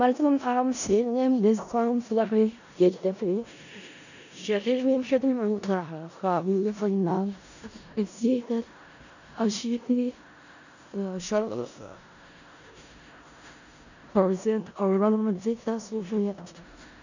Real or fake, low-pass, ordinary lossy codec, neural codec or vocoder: fake; 7.2 kHz; none; codec, 16 kHz in and 24 kHz out, 0.4 kbps, LongCat-Audio-Codec, four codebook decoder